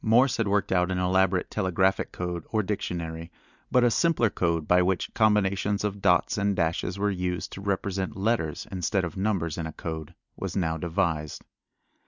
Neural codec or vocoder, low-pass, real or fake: none; 7.2 kHz; real